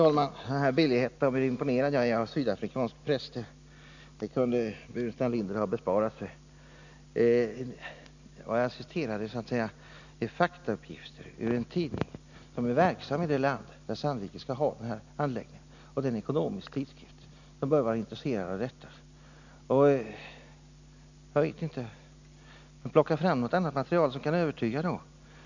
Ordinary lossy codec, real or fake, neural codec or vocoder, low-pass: none; real; none; 7.2 kHz